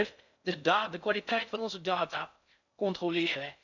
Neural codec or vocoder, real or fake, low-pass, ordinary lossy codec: codec, 16 kHz in and 24 kHz out, 0.6 kbps, FocalCodec, streaming, 4096 codes; fake; 7.2 kHz; none